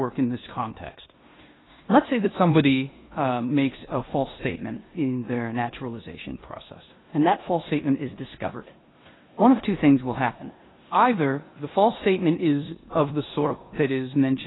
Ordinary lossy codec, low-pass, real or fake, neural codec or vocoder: AAC, 16 kbps; 7.2 kHz; fake; codec, 16 kHz in and 24 kHz out, 0.9 kbps, LongCat-Audio-Codec, four codebook decoder